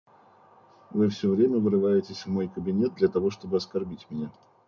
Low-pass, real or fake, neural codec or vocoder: 7.2 kHz; real; none